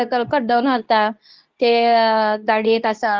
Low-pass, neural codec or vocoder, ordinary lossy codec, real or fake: 7.2 kHz; codec, 24 kHz, 0.9 kbps, WavTokenizer, medium speech release version 2; Opus, 32 kbps; fake